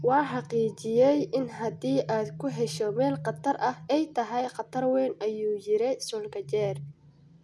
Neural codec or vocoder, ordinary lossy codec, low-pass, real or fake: none; none; none; real